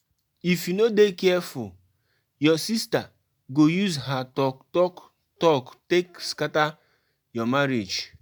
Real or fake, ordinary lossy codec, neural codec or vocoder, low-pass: real; none; none; none